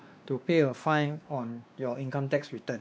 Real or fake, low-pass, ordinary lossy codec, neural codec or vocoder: fake; none; none; codec, 16 kHz, 2 kbps, X-Codec, WavLM features, trained on Multilingual LibriSpeech